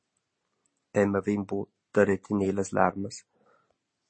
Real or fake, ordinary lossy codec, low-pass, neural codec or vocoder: real; MP3, 32 kbps; 9.9 kHz; none